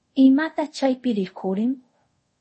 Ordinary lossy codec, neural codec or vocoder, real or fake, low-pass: MP3, 32 kbps; codec, 24 kHz, 0.5 kbps, DualCodec; fake; 10.8 kHz